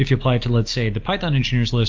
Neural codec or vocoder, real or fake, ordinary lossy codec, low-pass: none; real; Opus, 32 kbps; 7.2 kHz